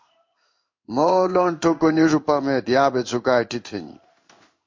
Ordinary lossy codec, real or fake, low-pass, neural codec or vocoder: MP3, 48 kbps; fake; 7.2 kHz; codec, 16 kHz in and 24 kHz out, 1 kbps, XY-Tokenizer